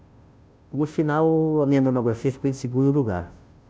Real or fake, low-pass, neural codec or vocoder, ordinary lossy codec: fake; none; codec, 16 kHz, 0.5 kbps, FunCodec, trained on Chinese and English, 25 frames a second; none